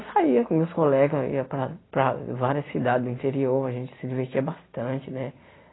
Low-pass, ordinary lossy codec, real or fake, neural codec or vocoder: 7.2 kHz; AAC, 16 kbps; real; none